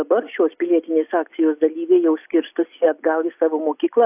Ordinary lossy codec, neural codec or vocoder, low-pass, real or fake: AAC, 32 kbps; none; 3.6 kHz; real